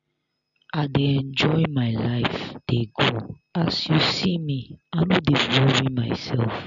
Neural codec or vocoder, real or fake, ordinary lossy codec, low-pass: none; real; none; 7.2 kHz